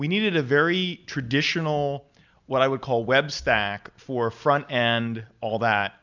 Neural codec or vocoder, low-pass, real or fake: none; 7.2 kHz; real